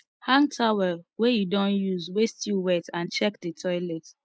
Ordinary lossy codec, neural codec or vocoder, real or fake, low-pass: none; none; real; none